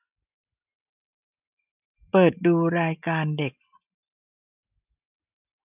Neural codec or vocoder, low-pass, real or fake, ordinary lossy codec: none; 3.6 kHz; real; none